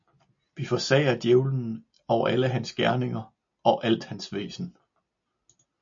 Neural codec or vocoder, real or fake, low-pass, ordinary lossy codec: none; real; 7.2 kHz; MP3, 48 kbps